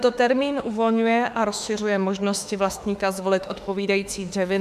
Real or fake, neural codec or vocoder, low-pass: fake; autoencoder, 48 kHz, 32 numbers a frame, DAC-VAE, trained on Japanese speech; 14.4 kHz